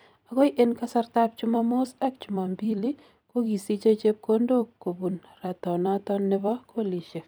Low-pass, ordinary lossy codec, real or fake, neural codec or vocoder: none; none; fake; vocoder, 44.1 kHz, 128 mel bands every 256 samples, BigVGAN v2